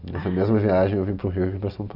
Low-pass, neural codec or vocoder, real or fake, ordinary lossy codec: 5.4 kHz; none; real; none